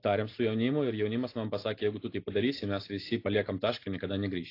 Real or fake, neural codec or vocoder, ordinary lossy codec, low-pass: real; none; AAC, 32 kbps; 5.4 kHz